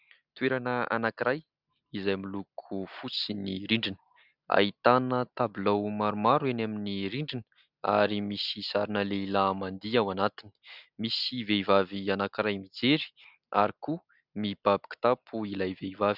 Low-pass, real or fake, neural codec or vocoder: 5.4 kHz; real; none